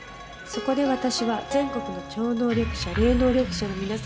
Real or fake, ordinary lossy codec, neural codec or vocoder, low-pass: real; none; none; none